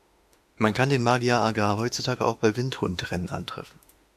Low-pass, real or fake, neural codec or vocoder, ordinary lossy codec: 14.4 kHz; fake; autoencoder, 48 kHz, 32 numbers a frame, DAC-VAE, trained on Japanese speech; AAC, 96 kbps